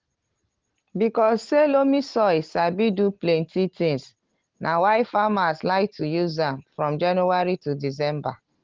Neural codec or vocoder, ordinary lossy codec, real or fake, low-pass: none; Opus, 16 kbps; real; 7.2 kHz